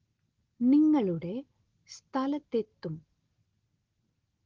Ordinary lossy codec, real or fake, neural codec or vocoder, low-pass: Opus, 16 kbps; real; none; 7.2 kHz